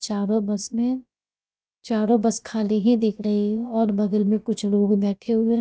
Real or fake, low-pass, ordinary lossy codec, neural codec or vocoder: fake; none; none; codec, 16 kHz, about 1 kbps, DyCAST, with the encoder's durations